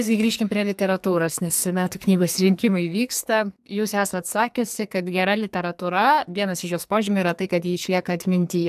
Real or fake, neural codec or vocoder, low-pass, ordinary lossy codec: fake; codec, 44.1 kHz, 2.6 kbps, SNAC; 14.4 kHz; AAC, 96 kbps